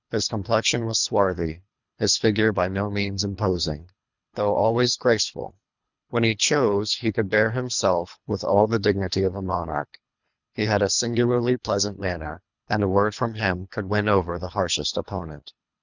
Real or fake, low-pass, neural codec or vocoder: fake; 7.2 kHz; codec, 24 kHz, 3 kbps, HILCodec